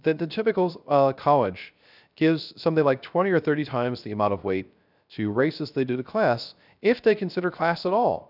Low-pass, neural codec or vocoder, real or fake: 5.4 kHz; codec, 16 kHz, 0.3 kbps, FocalCodec; fake